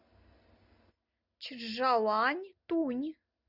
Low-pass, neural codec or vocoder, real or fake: 5.4 kHz; none; real